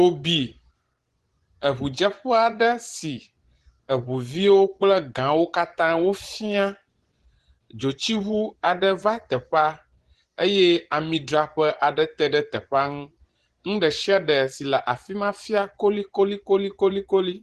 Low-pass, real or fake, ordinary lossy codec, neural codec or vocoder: 10.8 kHz; fake; Opus, 16 kbps; vocoder, 24 kHz, 100 mel bands, Vocos